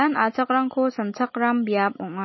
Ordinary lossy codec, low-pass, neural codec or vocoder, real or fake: MP3, 24 kbps; 7.2 kHz; none; real